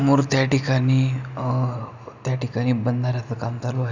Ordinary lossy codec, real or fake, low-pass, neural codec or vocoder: none; real; 7.2 kHz; none